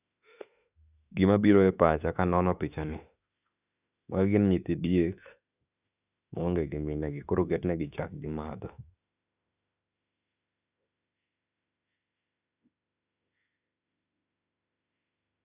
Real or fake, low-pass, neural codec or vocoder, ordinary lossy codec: fake; 3.6 kHz; autoencoder, 48 kHz, 32 numbers a frame, DAC-VAE, trained on Japanese speech; none